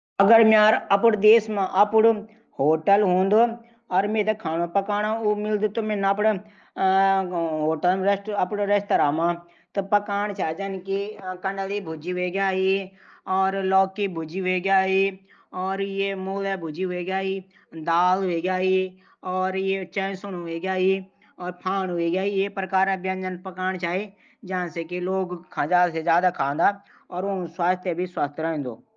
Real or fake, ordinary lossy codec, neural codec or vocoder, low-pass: real; Opus, 24 kbps; none; 7.2 kHz